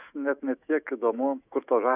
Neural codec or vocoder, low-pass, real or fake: autoencoder, 48 kHz, 128 numbers a frame, DAC-VAE, trained on Japanese speech; 3.6 kHz; fake